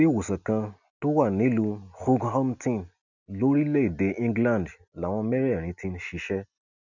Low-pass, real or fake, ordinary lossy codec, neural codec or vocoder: 7.2 kHz; real; none; none